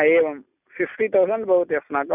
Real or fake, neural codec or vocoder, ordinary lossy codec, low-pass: real; none; none; 3.6 kHz